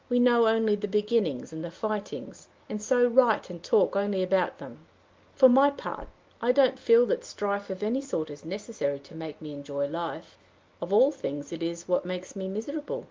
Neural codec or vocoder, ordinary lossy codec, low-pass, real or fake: none; Opus, 24 kbps; 7.2 kHz; real